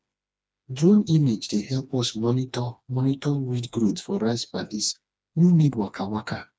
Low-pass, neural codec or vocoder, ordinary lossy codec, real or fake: none; codec, 16 kHz, 2 kbps, FreqCodec, smaller model; none; fake